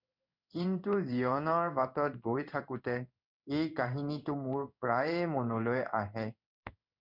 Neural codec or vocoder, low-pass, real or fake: codec, 16 kHz in and 24 kHz out, 1 kbps, XY-Tokenizer; 5.4 kHz; fake